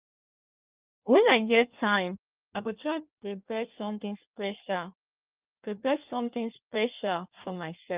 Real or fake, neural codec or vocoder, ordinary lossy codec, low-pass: fake; codec, 16 kHz in and 24 kHz out, 1.1 kbps, FireRedTTS-2 codec; Opus, 32 kbps; 3.6 kHz